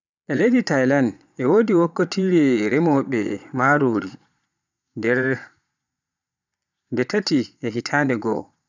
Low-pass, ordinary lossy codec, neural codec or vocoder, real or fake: 7.2 kHz; none; vocoder, 22.05 kHz, 80 mel bands, Vocos; fake